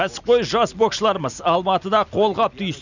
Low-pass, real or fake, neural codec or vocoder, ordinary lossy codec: 7.2 kHz; real; none; none